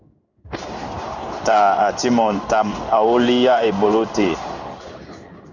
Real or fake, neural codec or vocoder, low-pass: fake; codec, 16 kHz in and 24 kHz out, 1 kbps, XY-Tokenizer; 7.2 kHz